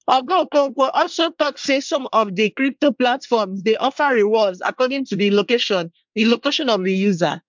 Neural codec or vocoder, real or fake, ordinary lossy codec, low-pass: codec, 24 kHz, 1 kbps, SNAC; fake; MP3, 64 kbps; 7.2 kHz